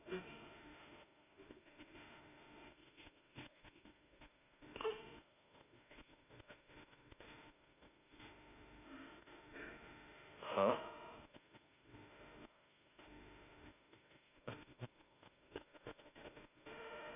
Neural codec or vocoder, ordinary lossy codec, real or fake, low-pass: autoencoder, 48 kHz, 32 numbers a frame, DAC-VAE, trained on Japanese speech; none; fake; 3.6 kHz